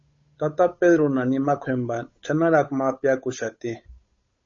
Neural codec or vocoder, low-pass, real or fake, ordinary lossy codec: codec, 16 kHz, 8 kbps, FunCodec, trained on Chinese and English, 25 frames a second; 7.2 kHz; fake; MP3, 32 kbps